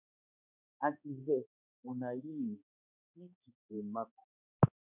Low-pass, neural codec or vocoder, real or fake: 3.6 kHz; codec, 16 kHz, 2 kbps, X-Codec, HuBERT features, trained on balanced general audio; fake